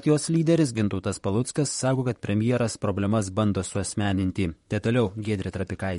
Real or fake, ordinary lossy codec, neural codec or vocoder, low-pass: fake; MP3, 48 kbps; vocoder, 44.1 kHz, 128 mel bands, Pupu-Vocoder; 19.8 kHz